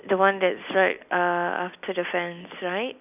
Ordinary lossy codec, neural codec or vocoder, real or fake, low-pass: none; none; real; 3.6 kHz